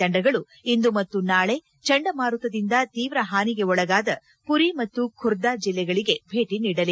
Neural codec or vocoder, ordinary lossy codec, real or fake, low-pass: none; none; real; none